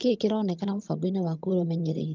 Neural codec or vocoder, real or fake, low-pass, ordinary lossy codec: vocoder, 22.05 kHz, 80 mel bands, HiFi-GAN; fake; 7.2 kHz; Opus, 24 kbps